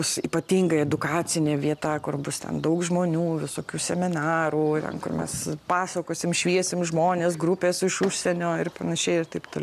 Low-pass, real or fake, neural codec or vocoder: 14.4 kHz; real; none